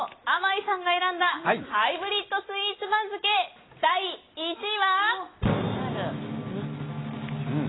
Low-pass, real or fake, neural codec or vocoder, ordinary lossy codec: 7.2 kHz; real; none; AAC, 16 kbps